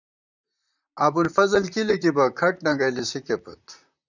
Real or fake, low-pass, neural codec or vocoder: fake; 7.2 kHz; vocoder, 44.1 kHz, 128 mel bands, Pupu-Vocoder